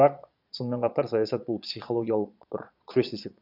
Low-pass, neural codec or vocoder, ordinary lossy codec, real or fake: 5.4 kHz; vocoder, 22.05 kHz, 80 mel bands, Vocos; none; fake